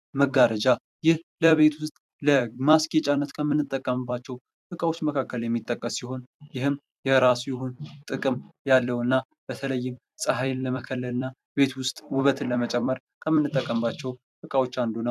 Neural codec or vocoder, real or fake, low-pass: vocoder, 44.1 kHz, 128 mel bands every 256 samples, BigVGAN v2; fake; 14.4 kHz